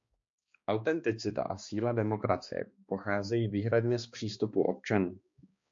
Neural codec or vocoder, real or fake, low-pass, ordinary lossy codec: codec, 16 kHz, 2 kbps, X-Codec, HuBERT features, trained on balanced general audio; fake; 7.2 kHz; MP3, 48 kbps